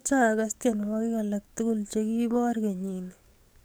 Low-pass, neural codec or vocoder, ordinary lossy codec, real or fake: none; codec, 44.1 kHz, 7.8 kbps, DAC; none; fake